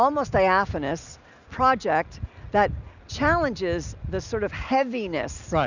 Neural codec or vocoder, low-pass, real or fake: none; 7.2 kHz; real